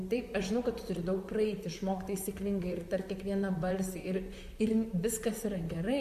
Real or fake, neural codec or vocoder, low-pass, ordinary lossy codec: fake; vocoder, 44.1 kHz, 128 mel bands, Pupu-Vocoder; 14.4 kHz; MP3, 96 kbps